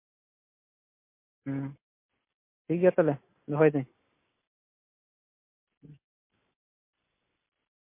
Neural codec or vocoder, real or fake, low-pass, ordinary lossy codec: none; real; 3.6 kHz; MP3, 24 kbps